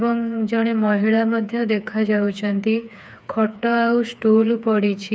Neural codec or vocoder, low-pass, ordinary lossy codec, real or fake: codec, 16 kHz, 4 kbps, FreqCodec, smaller model; none; none; fake